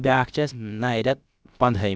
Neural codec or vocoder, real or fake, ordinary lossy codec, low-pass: codec, 16 kHz, about 1 kbps, DyCAST, with the encoder's durations; fake; none; none